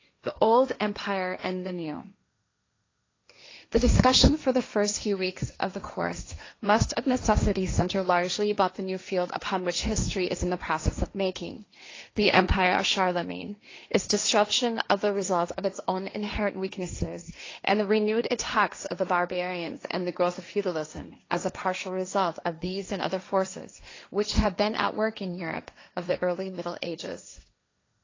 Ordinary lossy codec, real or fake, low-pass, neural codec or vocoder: AAC, 32 kbps; fake; 7.2 kHz; codec, 16 kHz, 1.1 kbps, Voila-Tokenizer